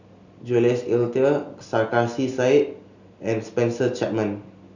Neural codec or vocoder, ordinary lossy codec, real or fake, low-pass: none; none; real; 7.2 kHz